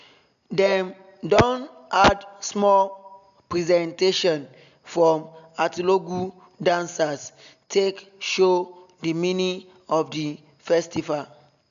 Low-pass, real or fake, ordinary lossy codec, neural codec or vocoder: 7.2 kHz; real; AAC, 96 kbps; none